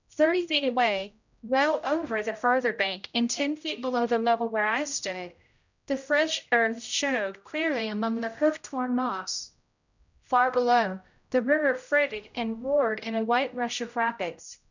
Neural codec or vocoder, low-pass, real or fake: codec, 16 kHz, 0.5 kbps, X-Codec, HuBERT features, trained on general audio; 7.2 kHz; fake